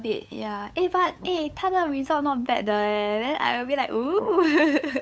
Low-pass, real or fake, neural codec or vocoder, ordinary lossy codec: none; fake; codec, 16 kHz, 8 kbps, FunCodec, trained on LibriTTS, 25 frames a second; none